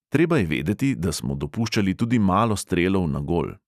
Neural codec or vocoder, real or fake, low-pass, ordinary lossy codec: none; real; 14.4 kHz; none